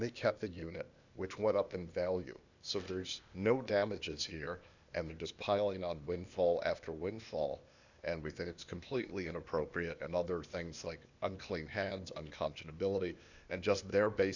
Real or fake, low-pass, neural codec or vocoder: fake; 7.2 kHz; codec, 16 kHz, 0.8 kbps, ZipCodec